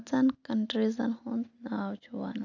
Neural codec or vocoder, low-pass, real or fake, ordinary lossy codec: none; 7.2 kHz; real; none